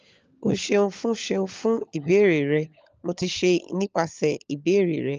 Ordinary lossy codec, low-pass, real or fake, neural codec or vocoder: Opus, 24 kbps; 7.2 kHz; fake; codec, 16 kHz, 16 kbps, FunCodec, trained on LibriTTS, 50 frames a second